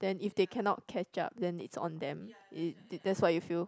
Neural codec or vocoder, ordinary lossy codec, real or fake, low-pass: none; none; real; none